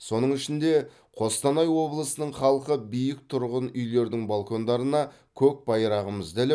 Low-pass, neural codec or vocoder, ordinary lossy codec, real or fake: none; none; none; real